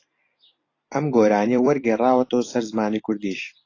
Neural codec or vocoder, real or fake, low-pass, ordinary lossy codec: vocoder, 44.1 kHz, 128 mel bands every 256 samples, BigVGAN v2; fake; 7.2 kHz; AAC, 32 kbps